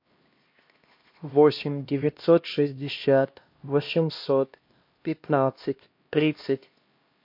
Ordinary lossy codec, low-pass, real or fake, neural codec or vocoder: MP3, 32 kbps; 5.4 kHz; fake; codec, 16 kHz, 1 kbps, X-Codec, HuBERT features, trained on LibriSpeech